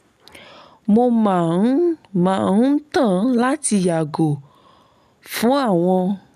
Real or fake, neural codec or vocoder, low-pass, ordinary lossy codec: real; none; 14.4 kHz; none